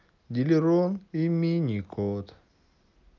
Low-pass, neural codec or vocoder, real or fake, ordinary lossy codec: 7.2 kHz; none; real; Opus, 24 kbps